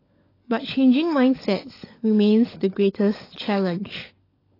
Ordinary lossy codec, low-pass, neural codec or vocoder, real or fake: AAC, 24 kbps; 5.4 kHz; codec, 16 kHz, 16 kbps, FunCodec, trained on LibriTTS, 50 frames a second; fake